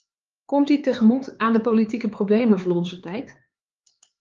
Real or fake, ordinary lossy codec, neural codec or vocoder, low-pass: fake; Opus, 32 kbps; codec, 16 kHz, 4 kbps, X-Codec, HuBERT features, trained on LibriSpeech; 7.2 kHz